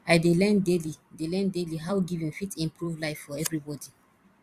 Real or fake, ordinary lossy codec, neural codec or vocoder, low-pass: fake; Opus, 64 kbps; vocoder, 44.1 kHz, 128 mel bands every 256 samples, BigVGAN v2; 14.4 kHz